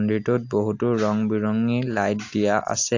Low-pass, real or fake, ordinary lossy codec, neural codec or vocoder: 7.2 kHz; real; AAC, 48 kbps; none